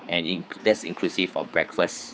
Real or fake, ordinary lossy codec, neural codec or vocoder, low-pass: fake; none; codec, 16 kHz, 4 kbps, X-Codec, HuBERT features, trained on general audio; none